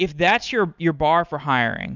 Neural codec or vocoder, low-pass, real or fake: none; 7.2 kHz; real